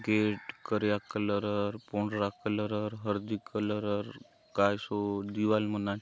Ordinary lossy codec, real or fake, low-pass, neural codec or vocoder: none; real; none; none